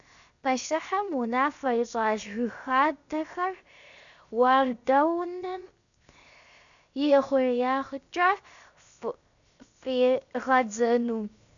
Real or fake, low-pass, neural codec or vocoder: fake; 7.2 kHz; codec, 16 kHz, 0.7 kbps, FocalCodec